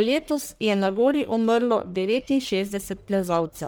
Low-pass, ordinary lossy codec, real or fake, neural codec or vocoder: none; none; fake; codec, 44.1 kHz, 1.7 kbps, Pupu-Codec